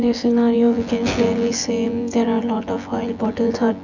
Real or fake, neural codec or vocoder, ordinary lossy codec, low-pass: fake; vocoder, 24 kHz, 100 mel bands, Vocos; none; 7.2 kHz